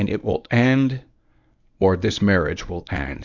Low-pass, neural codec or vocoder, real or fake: 7.2 kHz; codec, 24 kHz, 0.9 kbps, WavTokenizer, medium speech release version 1; fake